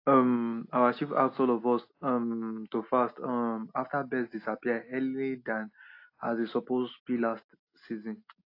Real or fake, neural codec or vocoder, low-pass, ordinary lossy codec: real; none; 5.4 kHz; AAC, 32 kbps